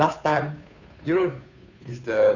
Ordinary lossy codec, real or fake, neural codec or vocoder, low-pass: none; fake; codec, 16 kHz, 2 kbps, FunCodec, trained on Chinese and English, 25 frames a second; 7.2 kHz